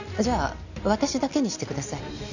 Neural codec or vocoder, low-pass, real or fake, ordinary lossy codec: none; 7.2 kHz; real; none